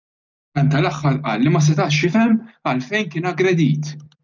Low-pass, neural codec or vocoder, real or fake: 7.2 kHz; none; real